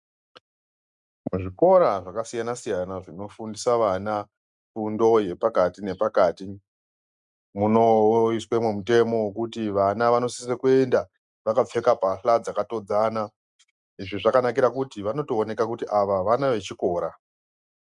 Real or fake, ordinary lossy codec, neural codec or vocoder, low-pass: real; MP3, 96 kbps; none; 10.8 kHz